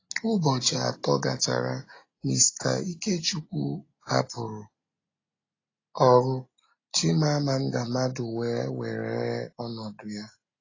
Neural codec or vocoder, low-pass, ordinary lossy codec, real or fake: none; 7.2 kHz; AAC, 32 kbps; real